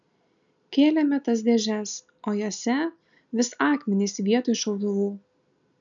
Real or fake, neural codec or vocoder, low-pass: real; none; 7.2 kHz